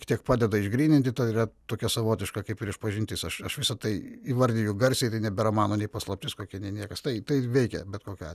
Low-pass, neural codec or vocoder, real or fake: 14.4 kHz; none; real